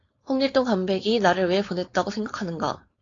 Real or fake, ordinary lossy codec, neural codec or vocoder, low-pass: fake; AAC, 32 kbps; codec, 16 kHz, 4.8 kbps, FACodec; 7.2 kHz